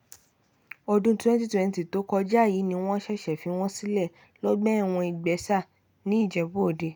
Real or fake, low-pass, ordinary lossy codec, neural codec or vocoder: real; 19.8 kHz; none; none